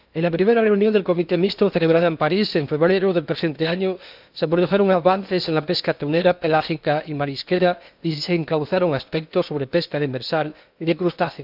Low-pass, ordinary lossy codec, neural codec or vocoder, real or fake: 5.4 kHz; none; codec, 16 kHz in and 24 kHz out, 0.8 kbps, FocalCodec, streaming, 65536 codes; fake